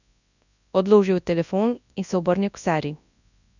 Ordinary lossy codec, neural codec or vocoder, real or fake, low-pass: none; codec, 24 kHz, 0.9 kbps, WavTokenizer, large speech release; fake; 7.2 kHz